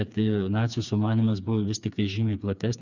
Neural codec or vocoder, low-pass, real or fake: codec, 16 kHz, 4 kbps, FreqCodec, smaller model; 7.2 kHz; fake